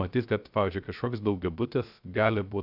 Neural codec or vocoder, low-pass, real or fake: codec, 16 kHz, 0.3 kbps, FocalCodec; 5.4 kHz; fake